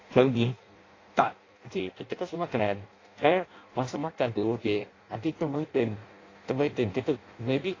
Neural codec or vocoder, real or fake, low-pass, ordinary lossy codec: codec, 16 kHz in and 24 kHz out, 0.6 kbps, FireRedTTS-2 codec; fake; 7.2 kHz; AAC, 32 kbps